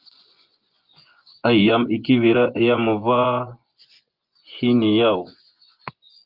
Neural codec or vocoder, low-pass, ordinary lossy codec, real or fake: vocoder, 24 kHz, 100 mel bands, Vocos; 5.4 kHz; Opus, 32 kbps; fake